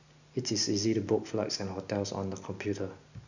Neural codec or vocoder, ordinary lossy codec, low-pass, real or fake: none; none; 7.2 kHz; real